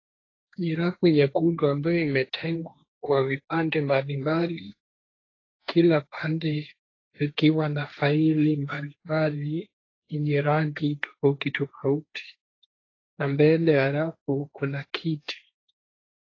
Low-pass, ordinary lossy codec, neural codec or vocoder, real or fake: 7.2 kHz; AAC, 32 kbps; codec, 16 kHz, 1.1 kbps, Voila-Tokenizer; fake